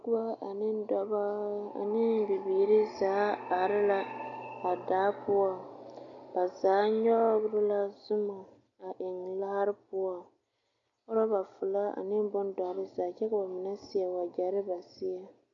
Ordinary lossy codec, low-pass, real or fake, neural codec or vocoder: MP3, 96 kbps; 7.2 kHz; real; none